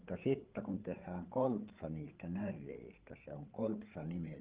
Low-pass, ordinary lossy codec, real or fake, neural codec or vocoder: 3.6 kHz; Opus, 16 kbps; fake; codec, 16 kHz, 8 kbps, FreqCodec, larger model